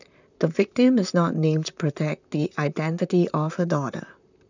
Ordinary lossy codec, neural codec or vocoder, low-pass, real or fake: none; vocoder, 44.1 kHz, 128 mel bands, Pupu-Vocoder; 7.2 kHz; fake